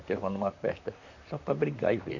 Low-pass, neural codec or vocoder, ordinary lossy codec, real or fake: 7.2 kHz; vocoder, 44.1 kHz, 128 mel bands, Pupu-Vocoder; none; fake